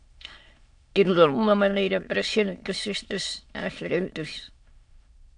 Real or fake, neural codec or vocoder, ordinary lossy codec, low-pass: fake; autoencoder, 22.05 kHz, a latent of 192 numbers a frame, VITS, trained on many speakers; AAC, 64 kbps; 9.9 kHz